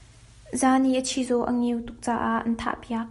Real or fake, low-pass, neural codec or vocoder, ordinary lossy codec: real; 14.4 kHz; none; MP3, 48 kbps